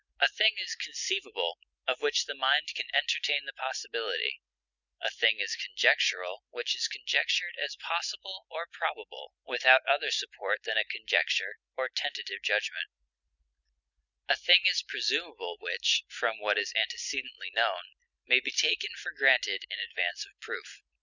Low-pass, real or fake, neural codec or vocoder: 7.2 kHz; real; none